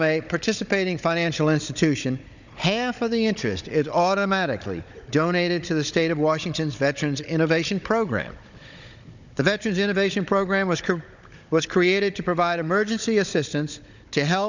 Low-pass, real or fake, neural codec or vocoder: 7.2 kHz; fake; codec, 16 kHz, 16 kbps, FunCodec, trained on Chinese and English, 50 frames a second